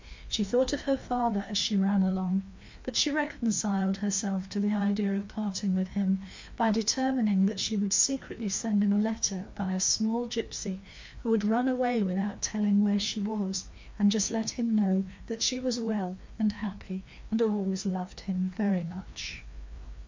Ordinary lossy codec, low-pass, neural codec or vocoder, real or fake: MP3, 48 kbps; 7.2 kHz; codec, 16 kHz, 2 kbps, FreqCodec, larger model; fake